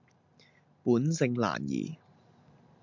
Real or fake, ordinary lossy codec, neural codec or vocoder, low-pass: real; MP3, 64 kbps; none; 7.2 kHz